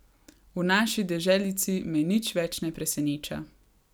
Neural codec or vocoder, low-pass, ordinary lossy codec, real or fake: none; none; none; real